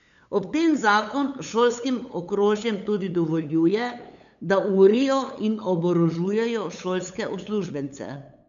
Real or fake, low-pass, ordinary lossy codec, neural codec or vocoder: fake; 7.2 kHz; none; codec, 16 kHz, 8 kbps, FunCodec, trained on LibriTTS, 25 frames a second